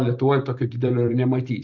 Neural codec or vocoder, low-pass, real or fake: none; 7.2 kHz; real